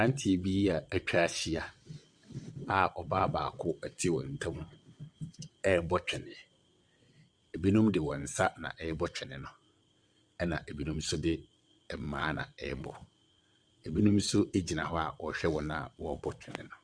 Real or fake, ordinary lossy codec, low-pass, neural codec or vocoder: fake; Opus, 64 kbps; 9.9 kHz; vocoder, 22.05 kHz, 80 mel bands, WaveNeXt